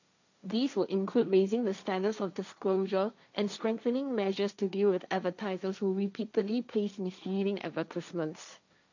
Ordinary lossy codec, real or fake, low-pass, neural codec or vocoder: none; fake; 7.2 kHz; codec, 16 kHz, 1.1 kbps, Voila-Tokenizer